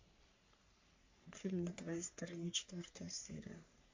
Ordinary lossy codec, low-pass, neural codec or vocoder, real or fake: MP3, 48 kbps; 7.2 kHz; codec, 44.1 kHz, 3.4 kbps, Pupu-Codec; fake